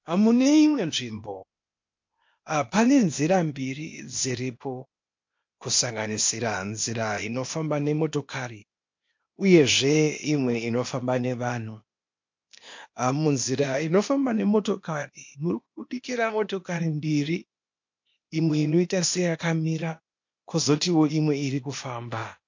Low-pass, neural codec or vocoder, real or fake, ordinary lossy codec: 7.2 kHz; codec, 16 kHz, 0.8 kbps, ZipCodec; fake; MP3, 48 kbps